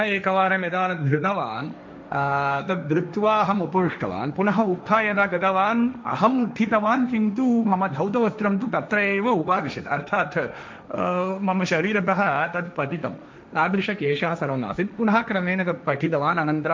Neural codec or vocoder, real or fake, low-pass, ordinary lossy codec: codec, 16 kHz, 1.1 kbps, Voila-Tokenizer; fake; none; none